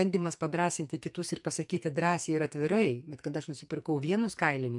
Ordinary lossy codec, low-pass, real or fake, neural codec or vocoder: MP3, 64 kbps; 10.8 kHz; fake; codec, 44.1 kHz, 2.6 kbps, SNAC